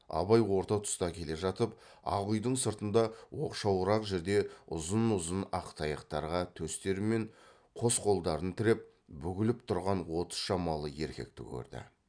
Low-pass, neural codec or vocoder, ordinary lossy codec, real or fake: 9.9 kHz; none; none; real